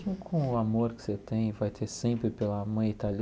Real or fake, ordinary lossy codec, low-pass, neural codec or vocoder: real; none; none; none